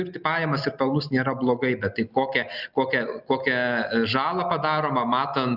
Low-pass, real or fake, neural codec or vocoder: 5.4 kHz; real; none